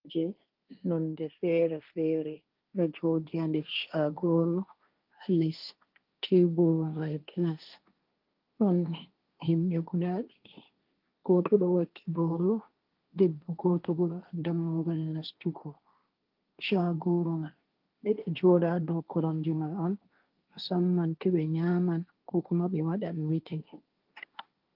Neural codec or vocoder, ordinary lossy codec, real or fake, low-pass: codec, 16 kHz, 1.1 kbps, Voila-Tokenizer; Opus, 24 kbps; fake; 5.4 kHz